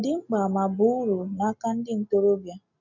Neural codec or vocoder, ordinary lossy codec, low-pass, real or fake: none; none; 7.2 kHz; real